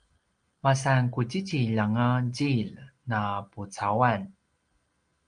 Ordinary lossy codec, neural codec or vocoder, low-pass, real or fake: Opus, 32 kbps; none; 9.9 kHz; real